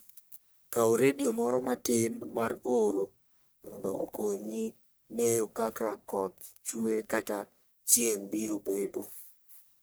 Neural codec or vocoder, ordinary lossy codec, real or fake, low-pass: codec, 44.1 kHz, 1.7 kbps, Pupu-Codec; none; fake; none